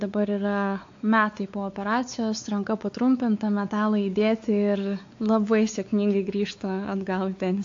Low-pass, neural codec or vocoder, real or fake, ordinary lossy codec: 7.2 kHz; codec, 16 kHz, 4 kbps, X-Codec, WavLM features, trained on Multilingual LibriSpeech; fake; AAC, 48 kbps